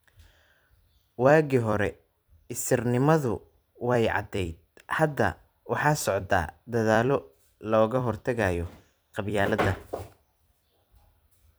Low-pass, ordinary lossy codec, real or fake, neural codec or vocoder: none; none; fake; vocoder, 44.1 kHz, 128 mel bands every 256 samples, BigVGAN v2